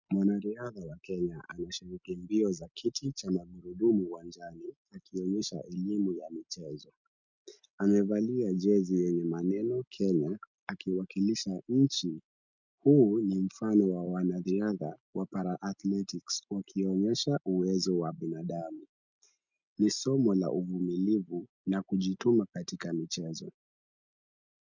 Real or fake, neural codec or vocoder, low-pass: real; none; 7.2 kHz